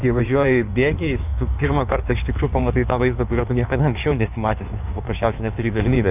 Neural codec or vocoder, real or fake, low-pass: codec, 16 kHz in and 24 kHz out, 1.1 kbps, FireRedTTS-2 codec; fake; 3.6 kHz